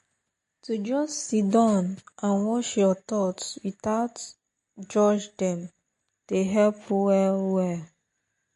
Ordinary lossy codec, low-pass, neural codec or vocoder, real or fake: MP3, 48 kbps; 14.4 kHz; none; real